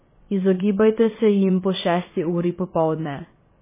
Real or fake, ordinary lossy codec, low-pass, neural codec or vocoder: fake; MP3, 16 kbps; 3.6 kHz; vocoder, 22.05 kHz, 80 mel bands, WaveNeXt